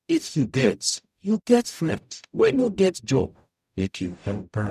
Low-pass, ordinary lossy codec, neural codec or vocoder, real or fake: 14.4 kHz; none; codec, 44.1 kHz, 0.9 kbps, DAC; fake